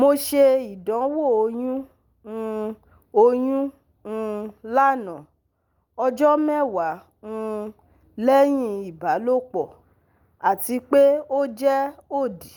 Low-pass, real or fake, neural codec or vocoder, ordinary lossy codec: 19.8 kHz; real; none; Opus, 32 kbps